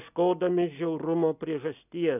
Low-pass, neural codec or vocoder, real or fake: 3.6 kHz; none; real